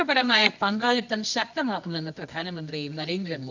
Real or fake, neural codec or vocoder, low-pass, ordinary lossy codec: fake; codec, 24 kHz, 0.9 kbps, WavTokenizer, medium music audio release; 7.2 kHz; none